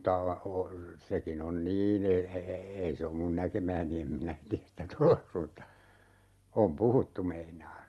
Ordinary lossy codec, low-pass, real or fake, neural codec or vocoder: Opus, 32 kbps; 19.8 kHz; real; none